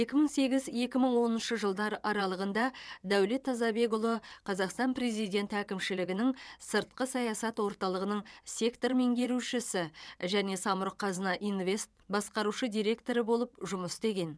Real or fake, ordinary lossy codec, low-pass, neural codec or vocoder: fake; none; none; vocoder, 22.05 kHz, 80 mel bands, WaveNeXt